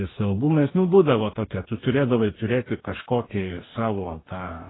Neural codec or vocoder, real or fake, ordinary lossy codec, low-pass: codec, 44.1 kHz, 2.6 kbps, DAC; fake; AAC, 16 kbps; 7.2 kHz